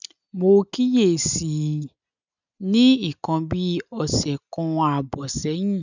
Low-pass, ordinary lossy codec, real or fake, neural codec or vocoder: 7.2 kHz; none; real; none